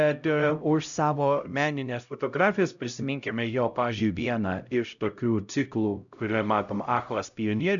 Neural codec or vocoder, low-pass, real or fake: codec, 16 kHz, 0.5 kbps, X-Codec, HuBERT features, trained on LibriSpeech; 7.2 kHz; fake